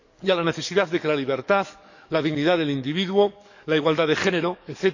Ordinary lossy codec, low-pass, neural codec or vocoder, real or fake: none; 7.2 kHz; codec, 44.1 kHz, 7.8 kbps, DAC; fake